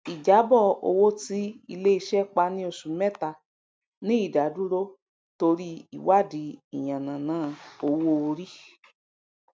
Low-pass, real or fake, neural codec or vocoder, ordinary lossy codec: none; real; none; none